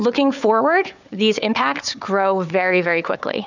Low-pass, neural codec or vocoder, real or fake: 7.2 kHz; codec, 16 kHz, 4 kbps, FunCodec, trained on Chinese and English, 50 frames a second; fake